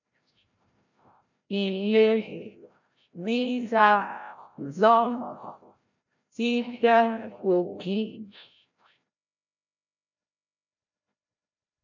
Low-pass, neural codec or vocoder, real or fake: 7.2 kHz; codec, 16 kHz, 0.5 kbps, FreqCodec, larger model; fake